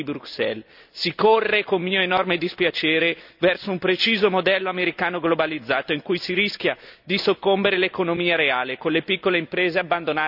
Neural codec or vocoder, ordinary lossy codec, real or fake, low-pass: none; none; real; 5.4 kHz